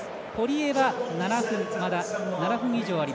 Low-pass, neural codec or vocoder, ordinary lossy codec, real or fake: none; none; none; real